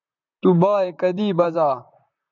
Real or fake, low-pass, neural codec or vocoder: fake; 7.2 kHz; vocoder, 44.1 kHz, 128 mel bands, Pupu-Vocoder